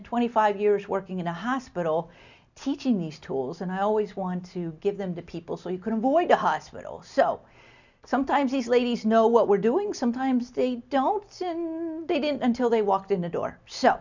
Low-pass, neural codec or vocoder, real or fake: 7.2 kHz; none; real